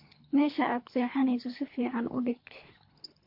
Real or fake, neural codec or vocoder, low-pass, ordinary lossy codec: fake; codec, 24 kHz, 3 kbps, HILCodec; 5.4 kHz; MP3, 32 kbps